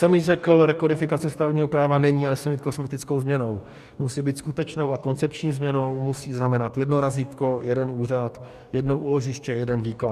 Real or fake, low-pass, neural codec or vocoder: fake; 14.4 kHz; codec, 44.1 kHz, 2.6 kbps, DAC